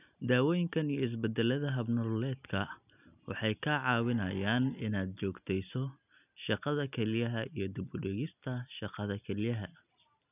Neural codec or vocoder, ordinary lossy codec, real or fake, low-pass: none; none; real; 3.6 kHz